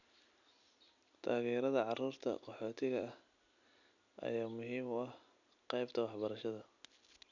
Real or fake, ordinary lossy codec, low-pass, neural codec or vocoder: real; none; 7.2 kHz; none